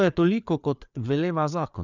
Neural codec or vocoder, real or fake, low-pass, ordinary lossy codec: codec, 16 kHz, 4 kbps, FreqCodec, larger model; fake; 7.2 kHz; none